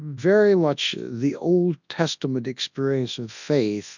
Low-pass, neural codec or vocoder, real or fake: 7.2 kHz; codec, 24 kHz, 0.9 kbps, WavTokenizer, large speech release; fake